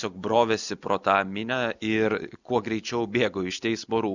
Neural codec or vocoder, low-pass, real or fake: none; 7.2 kHz; real